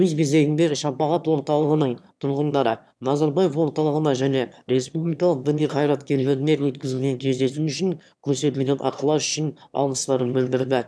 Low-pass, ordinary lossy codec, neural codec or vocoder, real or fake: none; none; autoencoder, 22.05 kHz, a latent of 192 numbers a frame, VITS, trained on one speaker; fake